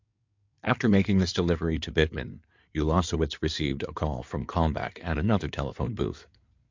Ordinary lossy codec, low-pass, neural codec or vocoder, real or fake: MP3, 64 kbps; 7.2 kHz; codec, 16 kHz in and 24 kHz out, 2.2 kbps, FireRedTTS-2 codec; fake